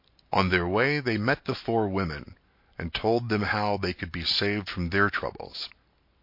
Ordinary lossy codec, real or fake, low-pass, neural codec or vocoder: MP3, 32 kbps; real; 5.4 kHz; none